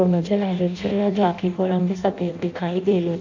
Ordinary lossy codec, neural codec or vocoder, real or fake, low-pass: none; codec, 16 kHz in and 24 kHz out, 0.6 kbps, FireRedTTS-2 codec; fake; 7.2 kHz